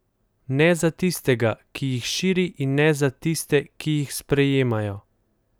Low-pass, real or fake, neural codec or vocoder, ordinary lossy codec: none; real; none; none